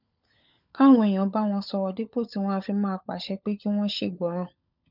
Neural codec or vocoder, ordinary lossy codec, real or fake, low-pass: codec, 16 kHz, 16 kbps, FunCodec, trained on LibriTTS, 50 frames a second; none; fake; 5.4 kHz